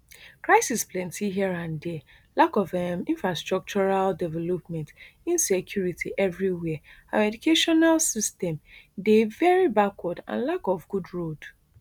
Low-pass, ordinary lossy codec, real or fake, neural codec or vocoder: 19.8 kHz; none; real; none